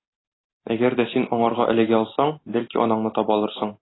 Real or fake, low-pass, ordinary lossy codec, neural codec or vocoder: real; 7.2 kHz; AAC, 16 kbps; none